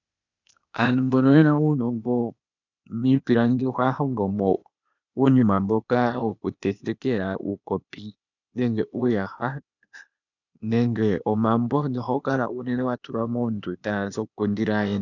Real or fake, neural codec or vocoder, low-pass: fake; codec, 16 kHz, 0.8 kbps, ZipCodec; 7.2 kHz